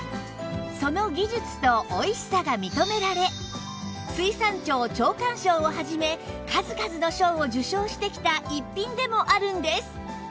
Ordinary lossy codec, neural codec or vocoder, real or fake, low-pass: none; none; real; none